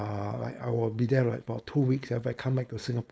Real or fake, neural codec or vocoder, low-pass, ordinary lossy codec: fake; codec, 16 kHz, 4.8 kbps, FACodec; none; none